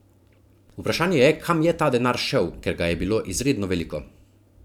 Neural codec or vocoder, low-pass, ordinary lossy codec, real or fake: vocoder, 44.1 kHz, 128 mel bands every 512 samples, BigVGAN v2; 19.8 kHz; none; fake